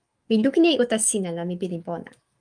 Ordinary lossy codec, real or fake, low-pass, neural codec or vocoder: Opus, 32 kbps; fake; 9.9 kHz; autoencoder, 48 kHz, 128 numbers a frame, DAC-VAE, trained on Japanese speech